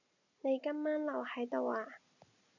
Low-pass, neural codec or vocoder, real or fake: 7.2 kHz; none; real